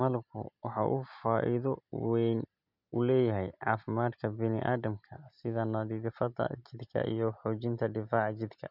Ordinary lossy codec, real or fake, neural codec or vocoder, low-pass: none; real; none; 5.4 kHz